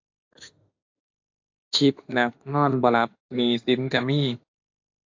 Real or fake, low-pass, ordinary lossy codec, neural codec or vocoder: fake; 7.2 kHz; AAC, 48 kbps; autoencoder, 48 kHz, 32 numbers a frame, DAC-VAE, trained on Japanese speech